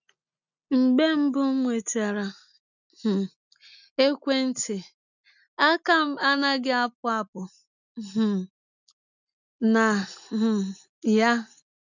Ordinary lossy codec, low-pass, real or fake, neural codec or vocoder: none; 7.2 kHz; real; none